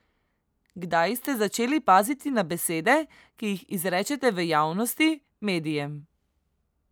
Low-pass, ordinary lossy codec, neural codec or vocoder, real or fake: none; none; none; real